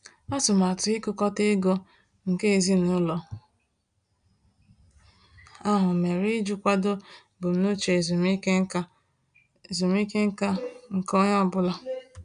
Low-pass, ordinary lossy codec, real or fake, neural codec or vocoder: 9.9 kHz; none; real; none